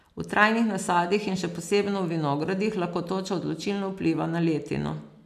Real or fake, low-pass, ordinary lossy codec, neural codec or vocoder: real; 14.4 kHz; none; none